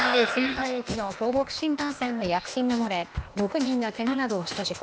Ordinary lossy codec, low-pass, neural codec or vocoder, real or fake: none; none; codec, 16 kHz, 0.8 kbps, ZipCodec; fake